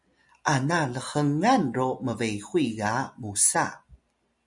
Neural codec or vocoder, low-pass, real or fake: none; 10.8 kHz; real